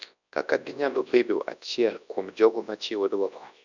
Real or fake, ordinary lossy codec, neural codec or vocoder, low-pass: fake; none; codec, 24 kHz, 0.9 kbps, WavTokenizer, large speech release; 7.2 kHz